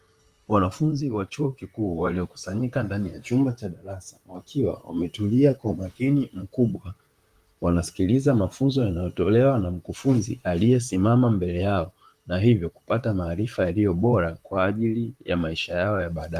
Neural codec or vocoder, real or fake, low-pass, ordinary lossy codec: vocoder, 44.1 kHz, 128 mel bands, Pupu-Vocoder; fake; 14.4 kHz; Opus, 32 kbps